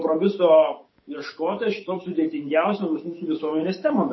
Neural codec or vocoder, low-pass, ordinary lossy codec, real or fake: codec, 16 kHz, 6 kbps, DAC; 7.2 kHz; MP3, 24 kbps; fake